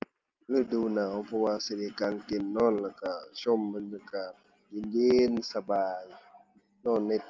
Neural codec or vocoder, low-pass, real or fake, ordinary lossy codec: none; 7.2 kHz; real; Opus, 32 kbps